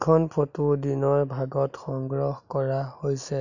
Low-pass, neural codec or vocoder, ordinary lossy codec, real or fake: 7.2 kHz; none; none; real